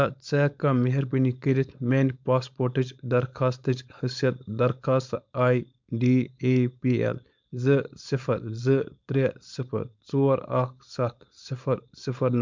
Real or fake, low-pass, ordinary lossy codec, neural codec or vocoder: fake; 7.2 kHz; MP3, 64 kbps; codec, 16 kHz, 4.8 kbps, FACodec